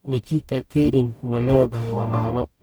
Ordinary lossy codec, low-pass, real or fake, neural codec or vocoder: none; none; fake; codec, 44.1 kHz, 0.9 kbps, DAC